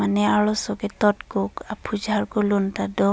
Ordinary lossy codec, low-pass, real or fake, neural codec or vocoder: none; none; real; none